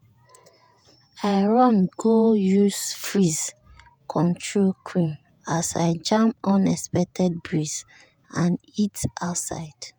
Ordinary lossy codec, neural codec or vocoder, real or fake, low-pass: none; vocoder, 48 kHz, 128 mel bands, Vocos; fake; none